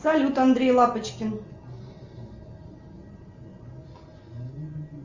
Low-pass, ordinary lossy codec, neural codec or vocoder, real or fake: 7.2 kHz; Opus, 32 kbps; none; real